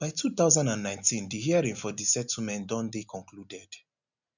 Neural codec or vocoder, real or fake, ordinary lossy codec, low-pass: none; real; none; 7.2 kHz